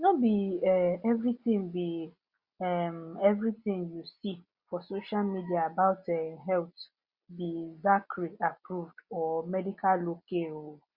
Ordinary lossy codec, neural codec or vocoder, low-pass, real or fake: Opus, 64 kbps; none; 5.4 kHz; real